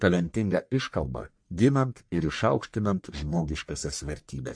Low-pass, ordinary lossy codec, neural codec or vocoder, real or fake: 9.9 kHz; MP3, 48 kbps; codec, 44.1 kHz, 1.7 kbps, Pupu-Codec; fake